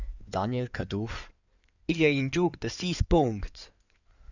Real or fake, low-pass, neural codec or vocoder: fake; 7.2 kHz; codec, 16 kHz in and 24 kHz out, 2.2 kbps, FireRedTTS-2 codec